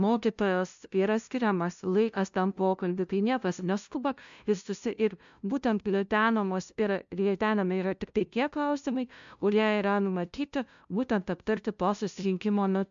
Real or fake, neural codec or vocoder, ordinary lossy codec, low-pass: fake; codec, 16 kHz, 0.5 kbps, FunCodec, trained on LibriTTS, 25 frames a second; MP3, 64 kbps; 7.2 kHz